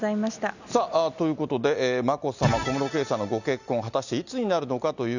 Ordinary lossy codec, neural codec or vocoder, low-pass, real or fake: none; none; 7.2 kHz; real